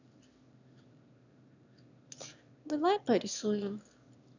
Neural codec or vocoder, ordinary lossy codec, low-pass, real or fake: autoencoder, 22.05 kHz, a latent of 192 numbers a frame, VITS, trained on one speaker; none; 7.2 kHz; fake